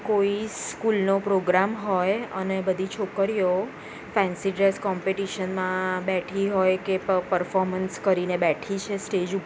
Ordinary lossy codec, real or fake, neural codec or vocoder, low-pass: none; real; none; none